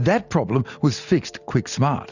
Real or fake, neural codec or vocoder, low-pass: real; none; 7.2 kHz